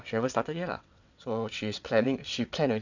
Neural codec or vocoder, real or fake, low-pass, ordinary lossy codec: vocoder, 22.05 kHz, 80 mel bands, WaveNeXt; fake; 7.2 kHz; none